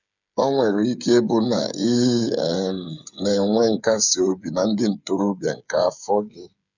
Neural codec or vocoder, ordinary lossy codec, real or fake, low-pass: codec, 16 kHz, 8 kbps, FreqCodec, smaller model; none; fake; 7.2 kHz